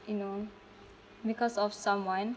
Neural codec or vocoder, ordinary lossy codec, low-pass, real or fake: none; none; none; real